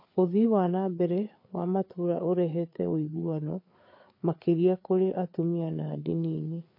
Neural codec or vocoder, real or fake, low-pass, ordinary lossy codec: codec, 16 kHz, 4 kbps, FreqCodec, larger model; fake; 5.4 kHz; MP3, 32 kbps